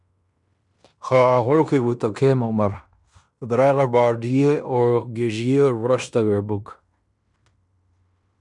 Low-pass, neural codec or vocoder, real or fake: 10.8 kHz; codec, 16 kHz in and 24 kHz out, 0.9 kbps, LongCat-Audio-Codec, fine tuned four codebook decoder; fake